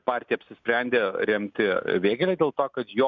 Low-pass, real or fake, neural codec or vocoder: 7.2 kHz; real; none